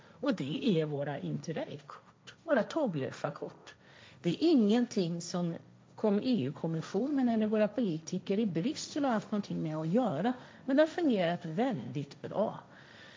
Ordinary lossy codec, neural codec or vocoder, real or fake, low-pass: none; codec, 16 kHz, 1.1 kbps, Voila-Tokenizer; fake; none